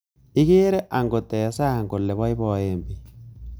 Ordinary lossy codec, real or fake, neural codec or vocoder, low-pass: none; real; none; none